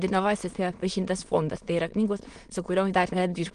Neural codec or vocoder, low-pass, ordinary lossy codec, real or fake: autoencoder, 22.05 kHz, a latent of 192 numbers a frame, VITS, trained on many speakers; 9.9 kHz; Opus, 24 kbps; fake